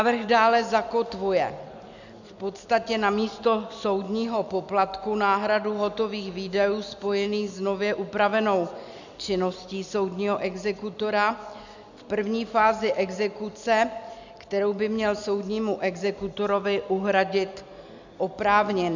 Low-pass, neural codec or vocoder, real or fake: 7.2 kHz; none; real